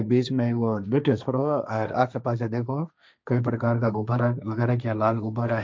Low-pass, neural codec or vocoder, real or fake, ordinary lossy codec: none; codec, 16 kHz, 1.1 kbps, Voila-Tokenizer; fake; none